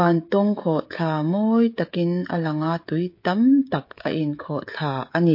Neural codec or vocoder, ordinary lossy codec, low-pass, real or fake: codec, 16 kHz, 16 kbps, FreqCodec, smaller model; MP3, 32 kbps; 5.4 kHz; fake